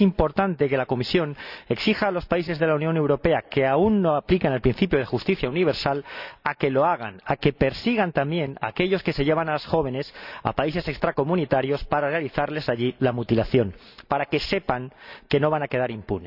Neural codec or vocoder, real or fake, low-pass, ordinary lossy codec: none; real; 5.4 kHz; none